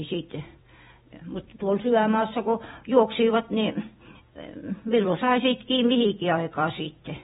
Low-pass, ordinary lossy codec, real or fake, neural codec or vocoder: 19.8 kHz; AAC, 16 kbps; fake; vocoder, 44.1 kHz, 128 mel bands every 256 samples, BigVGAN v2